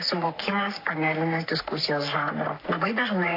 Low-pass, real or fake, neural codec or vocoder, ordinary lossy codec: 5.4 kHz; fake; codec, 44.1 kHz, 3.4 kbps, Pupu-Codec; AAC, 32 kbps